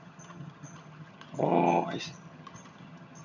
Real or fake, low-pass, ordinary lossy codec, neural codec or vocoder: fake; 7.2 kHz; none; vocoder, 22.05 kHz, 80 mel bands, HiFi-GAN